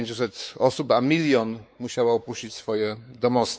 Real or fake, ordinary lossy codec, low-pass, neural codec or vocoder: fake; none; none; codec, 16 kHz, 4 kbps, X-Codec, WavLM features, trained on Multilingual LibriSpeech